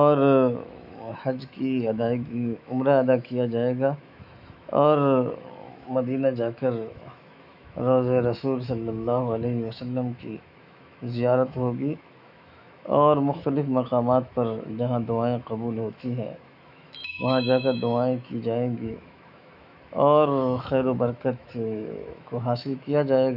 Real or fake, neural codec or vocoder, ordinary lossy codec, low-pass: fake; autoencoder, 48 kHz, 128 numbers a frame, DAC-VAE, trained on Japanese speech; none; 5.4 kHz